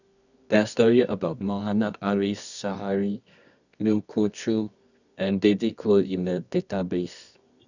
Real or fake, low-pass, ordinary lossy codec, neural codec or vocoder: fake; 7.2 kHz; none; codec, 24 kHz, 0.9 kbps, WavTokenizer, medium music audio release